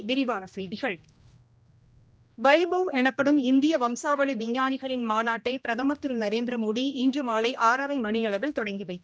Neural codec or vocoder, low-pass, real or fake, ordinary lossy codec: codec, 16 kHz, 1 kbps, X-Codec, HuBERT features, trained on general audio; none; fake; none